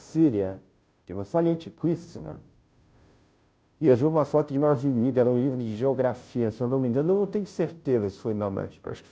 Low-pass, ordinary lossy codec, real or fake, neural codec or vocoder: none; none; fake; codec, 16 kHz, 0.5 kbps, FunCodec, trained on Chinese and English, 25 frames a second